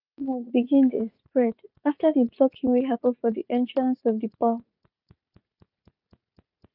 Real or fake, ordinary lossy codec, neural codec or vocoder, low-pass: fake; none; vocoder, 44.1 kHz, 80 mel bands, Vocos; 5.4 kHz